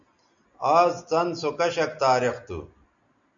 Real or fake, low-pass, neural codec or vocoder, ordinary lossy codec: real; 7.2 kHz; none; MP3, 96 kbps